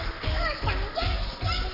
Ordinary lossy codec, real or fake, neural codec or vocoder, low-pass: none; fake; codec, 44.1 kHz, 7.8 kbps, Pupu-Codec; 5.4 kHz